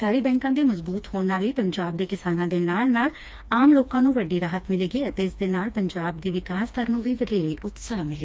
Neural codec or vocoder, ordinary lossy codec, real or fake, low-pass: codec, 16 kHz, 2 kbps, FreqCodec, smaller model; none; fake; none